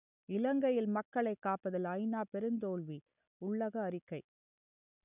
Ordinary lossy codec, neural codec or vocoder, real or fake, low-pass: none; none; real; 3.6 kHz